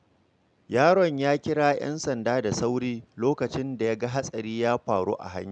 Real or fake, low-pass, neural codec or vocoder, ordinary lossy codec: real; 9.9 kHz; none; none